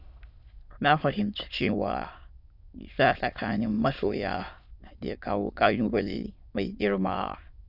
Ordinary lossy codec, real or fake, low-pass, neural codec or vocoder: none; fake; 5.4 kHz; autoencoder, 22.05 kHz, a latent of 192 numbers a frame, VITS, trained on many speakers